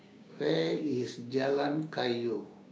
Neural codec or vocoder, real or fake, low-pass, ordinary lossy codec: codec, 16 kHz, 6 kbps, DAC; fake; none; none